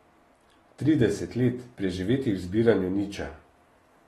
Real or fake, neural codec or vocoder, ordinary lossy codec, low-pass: real; none; AAC, 32 kbps; 19.8 kHz